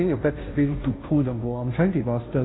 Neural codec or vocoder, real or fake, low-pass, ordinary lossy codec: codec, 16 kHz, 0.5 kbps, FunCodec, trained on Chinese and English, 25 frames a second; fake; 7.2 kHz; AAC, 16 kbps